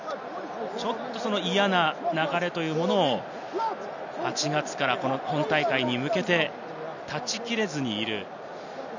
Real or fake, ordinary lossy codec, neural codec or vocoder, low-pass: real; none; none; 7.2 kHz